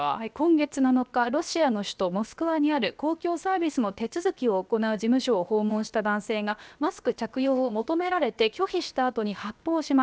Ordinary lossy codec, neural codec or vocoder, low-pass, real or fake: none; codec, 16 kHz, 0.7 kbps, FocalCodec; none; fake